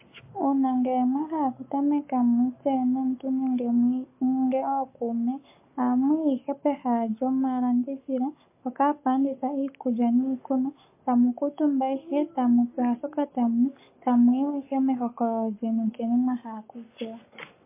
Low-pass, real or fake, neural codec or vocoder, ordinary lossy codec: 3.6 kHz; fake; codec, 44.1 kHz, 7.8 kbps, Pupu-Codec; MP3, 24 kbps